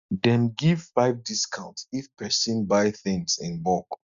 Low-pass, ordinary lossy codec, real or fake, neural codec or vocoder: 7.2 kHz; none; real; none